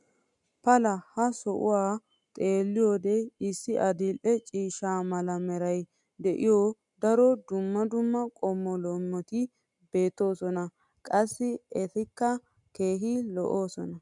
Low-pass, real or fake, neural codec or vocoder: 10.8 kHz; real; none